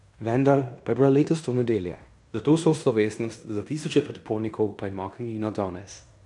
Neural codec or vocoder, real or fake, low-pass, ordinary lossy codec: codec, 16 kHz in and 24 kHz out, 0.9 kbps, LongCat-Audio-Codec, fine tuned four codebook decoder; fake; 10.8 kHz; none